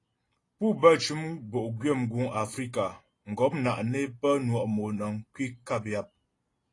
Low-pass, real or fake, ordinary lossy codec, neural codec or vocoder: 10.8 kHz; real; AAC, 32 kbps; none